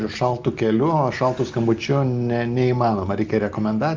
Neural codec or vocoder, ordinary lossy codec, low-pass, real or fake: none; Opus, 32 kbps; 7.2 kHz; real